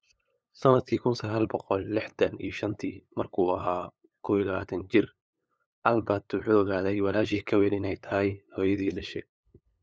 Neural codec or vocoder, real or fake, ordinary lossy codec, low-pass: codec, 16 kHz, 8 kbps, FunCodec, trained on LibriTTS, 25 frames a second; fake; none; none